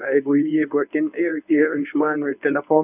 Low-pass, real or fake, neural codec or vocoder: 3.6 kHz; fake; codec, 24 kHz, 0.9 kbps, WavTokenizer, medium speech release version 1